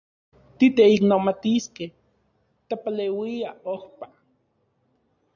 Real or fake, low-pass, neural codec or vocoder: real; 7.2 kHz; none